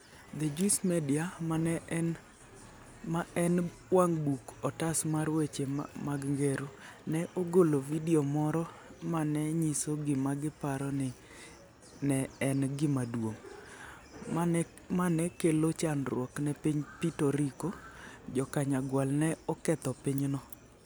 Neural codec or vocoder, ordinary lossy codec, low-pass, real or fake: none; none; none; real